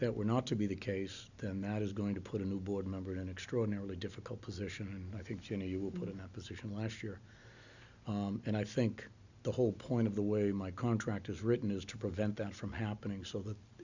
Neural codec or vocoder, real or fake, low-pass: none; real; 7.2 kHz